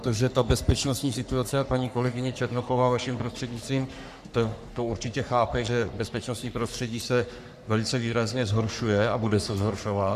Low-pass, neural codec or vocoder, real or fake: 14.4 kHz; codec, 44.1 kHz, 3.4 kbps, Pupu-Codec; fake